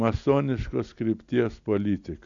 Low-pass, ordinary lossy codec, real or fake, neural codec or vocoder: 7.2 kHz; AAC, 64 kbps; real; none